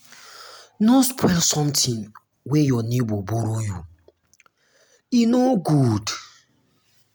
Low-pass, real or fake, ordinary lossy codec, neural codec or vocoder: none; real; none; none